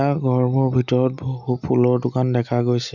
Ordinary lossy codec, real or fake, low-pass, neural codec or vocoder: none; real; 7.2 kHz; none